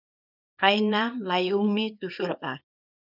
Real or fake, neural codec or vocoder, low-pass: fake; codec, 24 kHz, 0.9 kbps, WavTokenizer, small release; 5.4 kHz